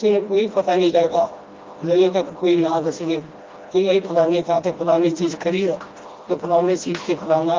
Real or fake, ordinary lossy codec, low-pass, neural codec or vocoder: fake; Opus, 24 kbps; 7.2 kHz; codec, 16 kHz, 1 kbps, FreqCodec, smaller model